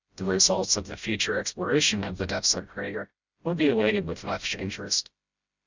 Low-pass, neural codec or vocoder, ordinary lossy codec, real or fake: 7.2 kHz; codec, 16 kHz, 0.5 kbps, FreqCodec, smaller model; Opus, 64 kbps; fake